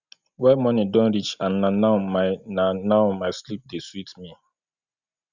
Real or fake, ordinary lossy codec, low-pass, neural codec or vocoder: real; Opus, 64 kbps; 7.2 kHz; none